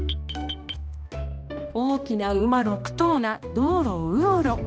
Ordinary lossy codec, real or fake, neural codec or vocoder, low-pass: none; fake; codec, 16 kHz, 1 kbps, X-Codec, HuBERT features, trained on balanced general audio; none